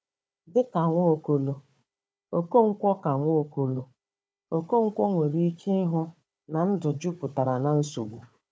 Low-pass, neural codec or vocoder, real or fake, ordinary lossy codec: none; codec, 16 kHz, 4 kbps, FunCodec, trained on Chinese and English, 50 frames a second; fake; none